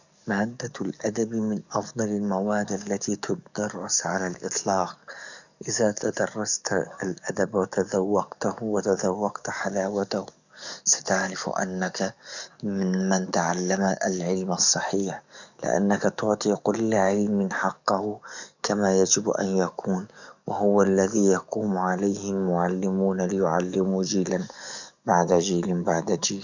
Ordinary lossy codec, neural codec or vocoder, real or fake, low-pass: none; codec, 44.1 kHz, 7.8 kbps, DAC; fake; 7.2 kHz